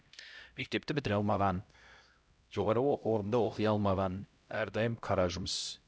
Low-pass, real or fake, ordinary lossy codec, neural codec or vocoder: none; fake; none; codec, 16 kHz, 0.5 kbps, X-Codec, HuBERT features, trained on LibriSpeech